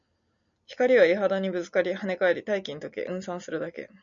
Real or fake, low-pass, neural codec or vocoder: real; 7.2 kHz; none